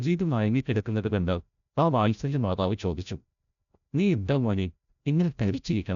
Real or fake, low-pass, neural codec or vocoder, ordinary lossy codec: fake; 7.2 kHz; codec, 16 kHz, 0.5 kbps, FreqCodec, larger model; none